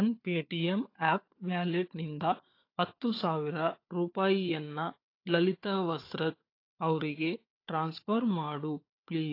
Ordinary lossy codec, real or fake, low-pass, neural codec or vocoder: AAC, 32 kbps; fake; 5.4 kHz; codec, 16 kHz, 4 kbps, FunCodec, trained on Chinese and English, 50 frames a second